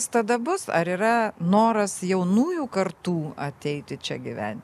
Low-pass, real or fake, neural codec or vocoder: 14.4 kHz; real; none